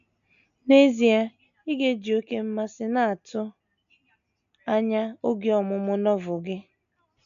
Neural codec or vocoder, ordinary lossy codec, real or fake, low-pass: none; none; real; 7.2 kHz